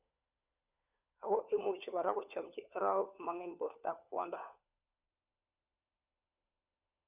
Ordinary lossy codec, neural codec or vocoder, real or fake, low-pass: none; codec, 16 kHz, 4 kbps, FunCodec, trained on LibriTTS, 50 frames a second; fake; 3.6 kHz